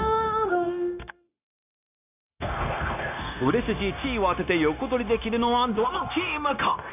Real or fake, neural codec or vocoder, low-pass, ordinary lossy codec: fake; codec, 16 kHz, 0.9 kbps, LongCat-Audio-Codec; 3.6 kHz; none